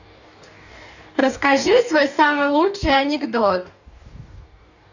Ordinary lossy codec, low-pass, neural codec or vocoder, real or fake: none; 7.2 kHz; codec, 44.1 kHz, 2.6 kbps, DAC; fake